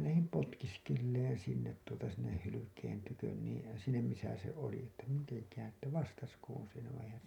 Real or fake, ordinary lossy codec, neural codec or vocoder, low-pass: real; none; none; 19.8 kHz